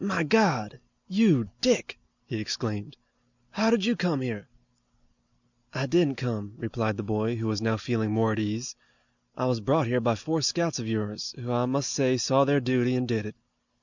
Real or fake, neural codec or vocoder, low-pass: real; none; 7.2 kHz